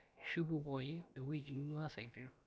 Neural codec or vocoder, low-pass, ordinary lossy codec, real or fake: codec, 16 kHz, about 1 kbps, DyCAST, with the encoder's durations; none; none; fake